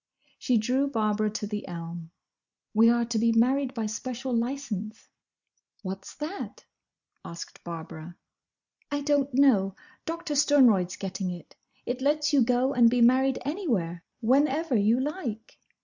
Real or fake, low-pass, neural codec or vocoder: real; 7.2 kHz; none